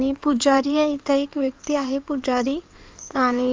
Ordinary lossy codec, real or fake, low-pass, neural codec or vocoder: Opus, 24 kbps; fake; 7.2 kHz; codec, 16 kHz in and 24 kHz out, 2.2 kbps, FireRedTTS-2 codec